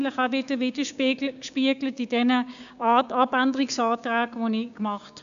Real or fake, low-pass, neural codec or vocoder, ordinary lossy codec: fake; 7.2 kHz; codec, 16 kHz, 6 kbps, DAC; none